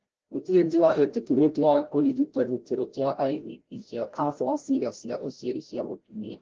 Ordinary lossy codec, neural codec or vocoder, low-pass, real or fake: Opus, 16 kbps; codec, 16 kHz, 0.5 kbps, FreqCodec, larger model; 7.2 kHz; fake